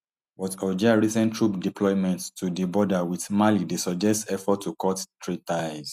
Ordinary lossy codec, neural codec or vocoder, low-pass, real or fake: none; none; 14.4 kHz; real